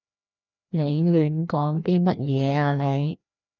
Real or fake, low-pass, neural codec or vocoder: fake; 7.2 kHz; codec, 16 kHz, 1 kbps, FreqCodec, larger model